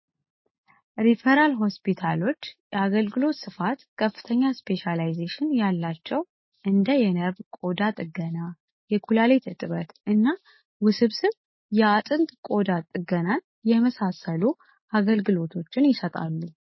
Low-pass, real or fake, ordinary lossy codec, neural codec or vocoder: 7.2 kHz; real; MP3, 24 kbps; none